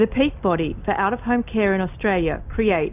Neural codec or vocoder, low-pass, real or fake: none; 3.6 kHz; real